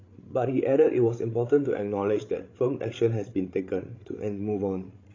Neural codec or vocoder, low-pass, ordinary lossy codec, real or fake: codec, 16 kHz, 16 kbps, FreqCodec, larger model; 7.2 kHz; AAC, 32 kbps; fake